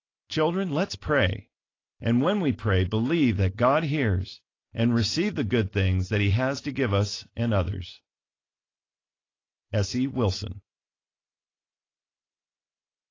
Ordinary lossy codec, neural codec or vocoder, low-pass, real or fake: AAC, 32 kbps; none; 7.2 kHz; real